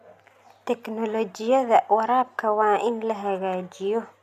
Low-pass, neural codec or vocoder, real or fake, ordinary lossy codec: 14.4 kHz; none; real; none